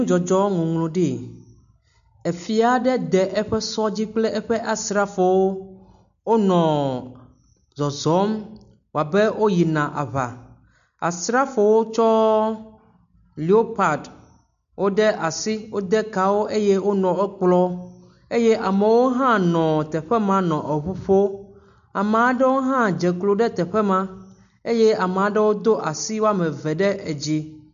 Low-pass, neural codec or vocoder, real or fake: 7.2 kHz; none; real